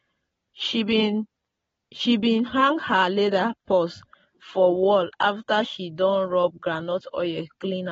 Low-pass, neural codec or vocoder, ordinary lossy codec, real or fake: 10.8 kHz; none; AAC, 24 kbps; real